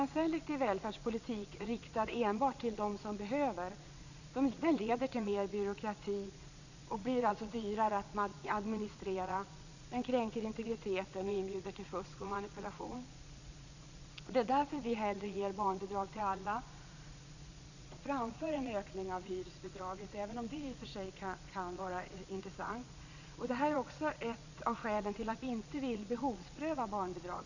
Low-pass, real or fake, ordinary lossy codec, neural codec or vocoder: 7.2 kHz; fake; none; vocoder, 22.05 kHz, 80 mel bands, Vocos